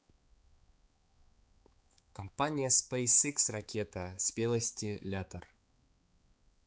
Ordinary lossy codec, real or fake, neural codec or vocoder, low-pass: none; fake; codec, 16 kHz, 4 kbps, X-Codec, HuBERT features, trained on balanced general audio; none